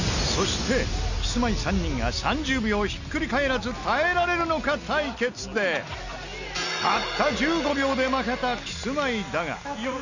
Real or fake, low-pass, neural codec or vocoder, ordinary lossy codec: real; 7.2 kHz; none; none